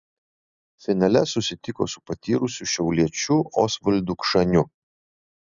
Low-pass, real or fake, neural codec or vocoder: 7.2 kHz; real; none